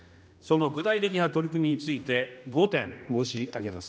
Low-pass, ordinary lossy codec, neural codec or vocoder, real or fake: none; none; codec, 16 kHz, 1 kbps, X-Codec, HuBERT features, trained on general audio; fake